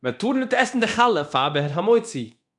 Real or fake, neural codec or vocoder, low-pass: fake; codec, 24 kHz, 0.9 kbps, DualCodec; 10.8 kHz